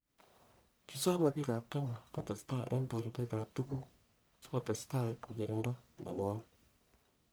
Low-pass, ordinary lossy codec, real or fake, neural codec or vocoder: none; none; fake; codec, 44.1 kHz, 1.7 kbps, Pupu-Codec